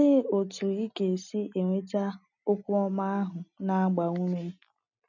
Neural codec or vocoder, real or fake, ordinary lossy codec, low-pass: none; real; none; 7.2 kHz